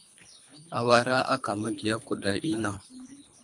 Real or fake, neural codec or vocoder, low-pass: fake; codec, 24 kHz, 3 kbps, HILCodec; 10.8 kHz